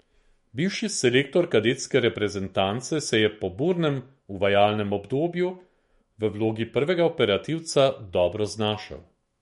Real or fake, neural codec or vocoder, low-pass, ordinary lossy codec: fake; autoencoder, 48 kHz, 128 numbers a frame, DAC-VAE, trained on Japanese speech; 19.8 kHz; MP3, 48 kbps